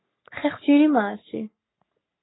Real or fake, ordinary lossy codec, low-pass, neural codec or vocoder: fake; AAC, 16 kbps; 7.2 kHz; codec, 44.1 kHz, 3.4 kbps, Pupu-Codec